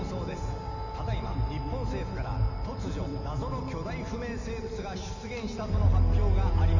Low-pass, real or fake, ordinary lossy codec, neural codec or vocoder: 7.2 kHz; real; none; none